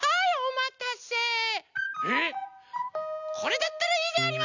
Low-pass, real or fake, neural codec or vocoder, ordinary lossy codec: 7.2 kHz; real; none; none